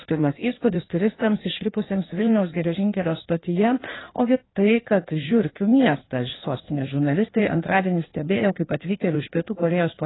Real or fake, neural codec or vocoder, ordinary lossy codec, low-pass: fake; codec, 16 kHz in and 24 kHz out, 1.1 kbps, FireRedTTS-2 codec; AAC, 16 kbps; 7.2 kHz